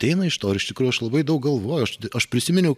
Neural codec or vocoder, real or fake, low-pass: none; real; 14.4 kHz